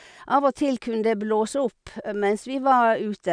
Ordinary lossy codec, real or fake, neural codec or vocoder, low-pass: none; real; none; 9.9 kHz